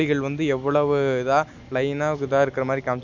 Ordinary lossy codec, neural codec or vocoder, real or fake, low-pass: MP3, 64 kbps; none; real; 7.2 kHz